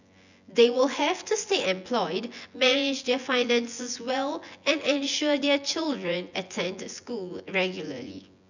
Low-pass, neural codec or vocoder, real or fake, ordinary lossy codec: 7.2 kHz; vocoder, 24 kHz, 100 mel bands, Vocos; fake; none